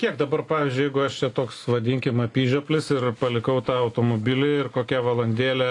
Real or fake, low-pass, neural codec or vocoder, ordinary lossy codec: real; 10.8 kHz; none; AAC, 48 kbps